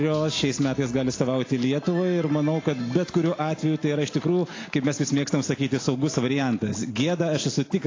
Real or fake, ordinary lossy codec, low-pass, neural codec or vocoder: real; AAC, 32 kbps; 7.2 kHz; none